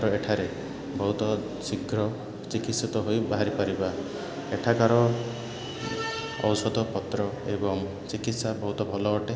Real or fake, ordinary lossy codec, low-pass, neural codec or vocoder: real; none; none; none